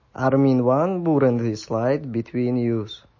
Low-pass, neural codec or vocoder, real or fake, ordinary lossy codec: 7.2 kHz; none; real; MP3, 32 kbps